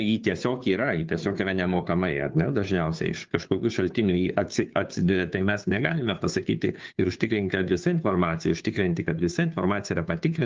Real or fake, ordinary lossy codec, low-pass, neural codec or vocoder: fake; Opus, 32 kbps; 7.2 kHz; codec, 16 kHz, 2 kbps, FunCodec, trained on Chinese and English, 25 frames a second